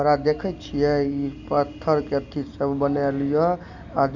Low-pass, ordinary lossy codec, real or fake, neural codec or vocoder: 7.2 kHz; none; real; none